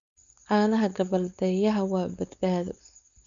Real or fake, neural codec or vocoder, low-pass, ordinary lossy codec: fake; codec, 16 kHz, 4.8 kbps, FACodec; 7.2 kHz; none